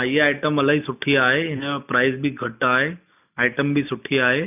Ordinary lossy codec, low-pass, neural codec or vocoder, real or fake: none; 3.6 kHz; none; real